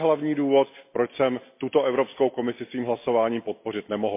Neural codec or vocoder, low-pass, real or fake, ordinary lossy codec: none; 3.6 kHz; real; MP3, 24 kbps